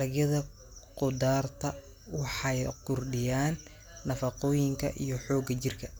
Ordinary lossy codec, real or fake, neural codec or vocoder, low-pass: none; real; none; none